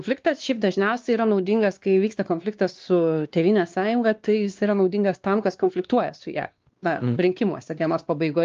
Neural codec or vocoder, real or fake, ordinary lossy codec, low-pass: codec, 16 kHz, 2 kbps, X-Codec, WavLM features, trained on Multilingual LibriSpeech; fake; Opus, 32 kbps; 7.2 kHz